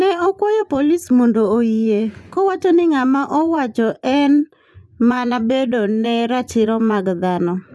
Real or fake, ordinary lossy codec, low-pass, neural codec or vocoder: fake; none; none; vocoder, 24 kHz, 100 mel bands, Vocos